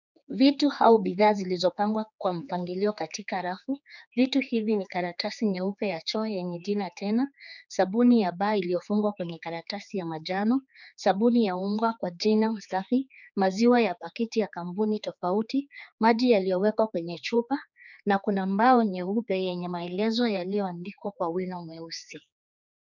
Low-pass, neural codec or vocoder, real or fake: 7.2 kHz; codec, 16 kHz, 4 kbps, X-Codec, HuBERT features, trained on general audio; fake